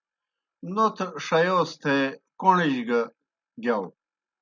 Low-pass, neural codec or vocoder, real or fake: 7.2 kHz; none; real